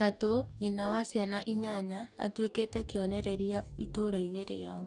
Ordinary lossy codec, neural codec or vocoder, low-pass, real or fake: none; codec, 44.1 kHz, 2.6 kbps, DAC; 10.8 kHz; fake